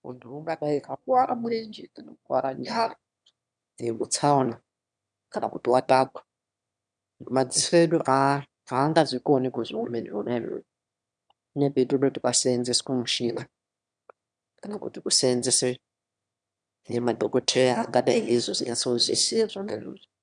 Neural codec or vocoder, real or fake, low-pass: autoencoder, 22.05 kHz, a latent of 192 numbers a frame, VITS, trained on one speaker; fake; 9.9 kHz